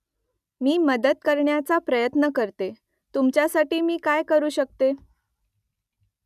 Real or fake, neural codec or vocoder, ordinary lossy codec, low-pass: real; none; none; 14.4 kHz